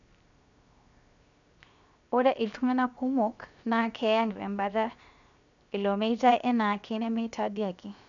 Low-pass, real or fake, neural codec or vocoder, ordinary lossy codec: 7.2 kHz; fake; codec, 16 kHz, 0.7 kbps, FocalCodec; none